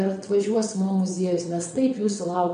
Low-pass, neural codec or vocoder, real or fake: 9.9 kHz; vocoder, 22.05 kHz, 80 mel bands, WaveNeXt; fake